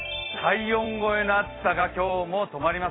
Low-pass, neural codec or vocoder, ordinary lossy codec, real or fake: 7.2 kHz; vocoder, 44.1 kHz, 128 mel bands every 256 samples, BigVGAN v2; AAC, 16 kbps; fake